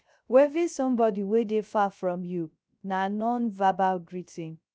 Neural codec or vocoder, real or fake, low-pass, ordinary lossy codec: codec, 16 kHz, 0.3 kbps, FocalCodec; fake; none; none